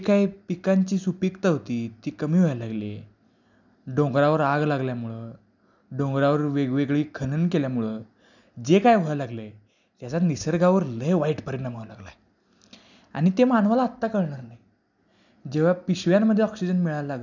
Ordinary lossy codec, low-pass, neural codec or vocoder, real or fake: none; 7.2 kHz; none; real